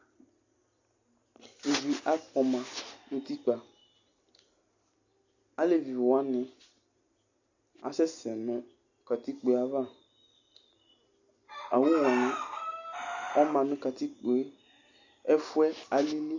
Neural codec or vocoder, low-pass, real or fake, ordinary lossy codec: none; 7.2 kHz; real; AAC, 48 kbps